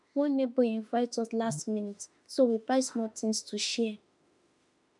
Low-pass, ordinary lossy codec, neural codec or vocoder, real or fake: 10.8 kHz; none; autoencoder, 48 kHz, 32 numbers a frame, DAC-VAE, trained on Japanese speech; fake